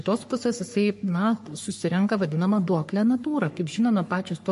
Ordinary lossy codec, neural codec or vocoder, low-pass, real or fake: MP3, 48 kbps; codec, 44.1 kHz, 3.4 kbps, Pupu-Codec; 14.4 kHz; fake